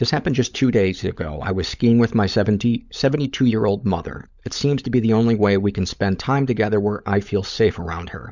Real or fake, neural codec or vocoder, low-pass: fake; codec, 16 kHz, 16 kbps, FunCodec, trained on LibriTTS, 50 frames a second; 7.2 kHz